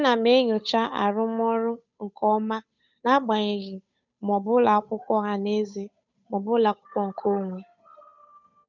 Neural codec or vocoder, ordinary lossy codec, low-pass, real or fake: codec, 16 kHz, 8 kbps, FunCodec, trained on Chinese and English, 25 frames a second; none; 7.2 kHz; fake